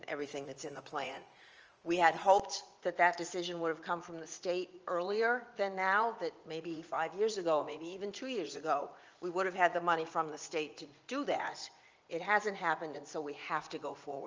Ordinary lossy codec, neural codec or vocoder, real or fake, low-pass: Opus, 24 kbps; vocoder, 44.1 kHz, 80 mel bands, Vocos; fake; 7.2 kHz